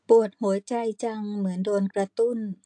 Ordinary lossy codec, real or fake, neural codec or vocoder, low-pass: AAC, 64 kbps; real; none; 9.9 kHz